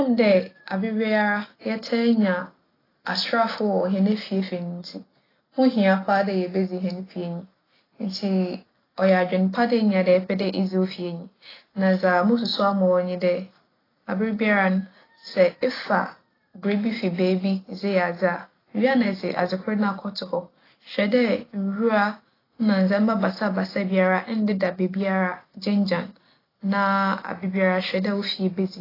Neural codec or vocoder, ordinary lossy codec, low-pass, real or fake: none; AAC, 24 kbps; 5.4 kHz; real